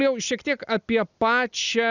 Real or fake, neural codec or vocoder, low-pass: real; none; 7.2 kHz